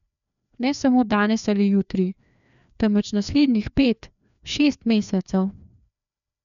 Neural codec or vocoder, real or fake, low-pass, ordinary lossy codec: codec, 16 kHz, 2 kbps, FreqCodec, larger model; fake; 7.2 kHz; none